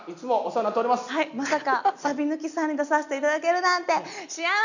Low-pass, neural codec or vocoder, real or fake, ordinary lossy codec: 7.2 kHz; none; real; none